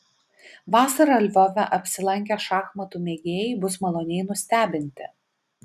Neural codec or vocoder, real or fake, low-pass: none; real; 14.4 kHz